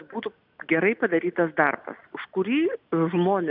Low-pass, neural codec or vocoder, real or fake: 5.4 kHz; none; real